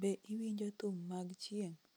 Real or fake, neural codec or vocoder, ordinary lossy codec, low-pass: real; none; none; none